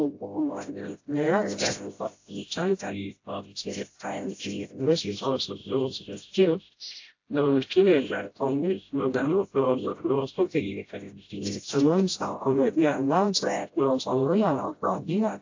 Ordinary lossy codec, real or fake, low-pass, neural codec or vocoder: AAC, 48 kbps; fake; 7.2 kHz; codec, 16 kHz, 0.5 kbps, FreqCodec, smaller model